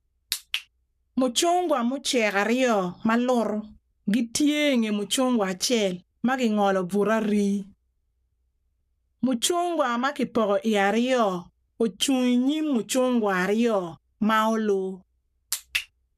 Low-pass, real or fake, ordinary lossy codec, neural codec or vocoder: 14.4 kHz; fake; none; codec, 44.1 kHz, 7.8 kbps, Pupu-Codec